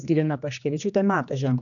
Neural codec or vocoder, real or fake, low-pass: codec, 16 kHz, 2 kbps, X-Codec, HuBERT features, trained on general audio; fake; 7.2 kHz